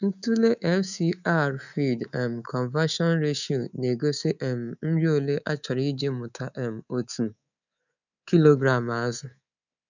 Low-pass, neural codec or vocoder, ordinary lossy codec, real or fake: 7.2 kHz; codec, 24 kHz, 3.1 kbps, DualCodec; none; fake